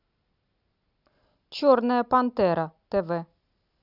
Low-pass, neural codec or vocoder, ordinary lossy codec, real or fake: 5.4 kHz; none; Opus, 64 kbps; real